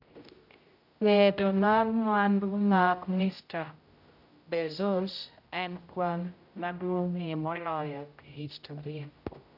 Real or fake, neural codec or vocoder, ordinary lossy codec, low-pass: fake; codec, 16 kHz, 0.5 kbps, X-Codec, HuBERT features, trained on general audio; none; 5.4 kHz